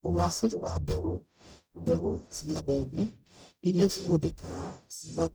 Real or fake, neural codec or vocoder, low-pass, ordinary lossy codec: fake; codec, 44.1 kHz, 0.9 kbps, DAC; none; none